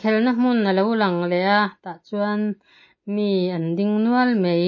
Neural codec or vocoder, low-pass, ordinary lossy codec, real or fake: none; 7.2 kHz; MP3, 32 kbps; real